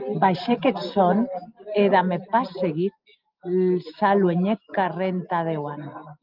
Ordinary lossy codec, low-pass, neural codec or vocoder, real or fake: Opus, 32 kbps; 5.4 kHz; none; real